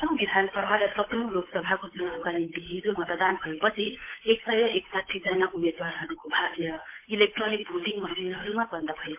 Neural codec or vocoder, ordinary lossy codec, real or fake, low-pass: codec, 16 kHz, 8 kbps, FunCodec, trained on Chinese and English, 25 frames a second; MP3, 24 kbps; fake; 3.6 kHz